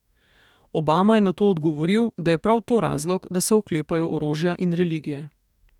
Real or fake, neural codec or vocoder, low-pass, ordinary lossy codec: fake; codec, 44.1 kHz, 2.6 kbps, DAC; 19.8 kHz; none